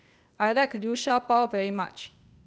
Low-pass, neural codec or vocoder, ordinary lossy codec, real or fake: none; codec, 16 kHz, 0.8 kbps, ZipCodec; none; fake